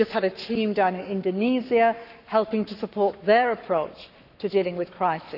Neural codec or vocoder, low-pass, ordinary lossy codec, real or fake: codec, 44.1 kHz, 7.8 kbps, Pupu-Codec; 5.4 kHz; none; fake